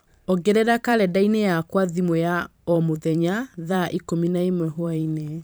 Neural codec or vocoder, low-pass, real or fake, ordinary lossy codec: vocoder, 44.1 kHz, 128 mel bands every 512 samples, BigVGAN v2; none; fake; none